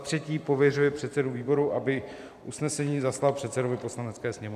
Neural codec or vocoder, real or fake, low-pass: vocoder, 44.1 kHz, 128 mel bands every 512 samples, BigVGAN v2; fake; 14.4 kHz